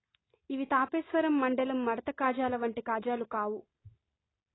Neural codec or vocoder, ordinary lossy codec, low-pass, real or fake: none; AAC, 16 kbps; 7.2 kHz; real